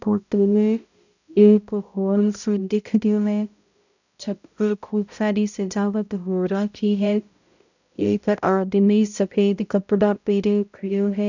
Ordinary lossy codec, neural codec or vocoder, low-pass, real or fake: none; codec, 16 kHz, 0.5 kbps, X-Codec, HuBERT features, trained on balanced general audio; 7.2 kHz; fake